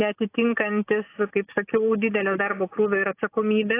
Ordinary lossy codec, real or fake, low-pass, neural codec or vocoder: AAC, 24 kbps; real; 3.6 kHz; none